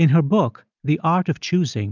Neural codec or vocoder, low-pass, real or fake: none; 7.2 kHz; real